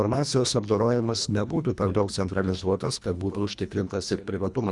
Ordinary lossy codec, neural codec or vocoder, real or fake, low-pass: Opus, 64 kbps; codec, 24 kHz, 1.5 kbps, HILCodec; fake; 10.8 kHz